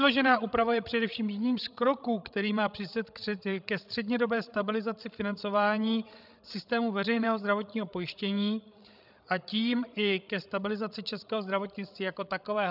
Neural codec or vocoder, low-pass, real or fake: codec, 16 kHz, 16 kbps, FreqCodec, larger model; 5.4 kHz; fake